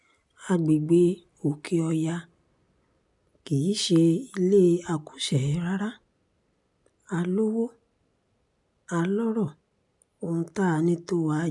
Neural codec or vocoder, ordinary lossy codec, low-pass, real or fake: none; none; 10.8 kHz; real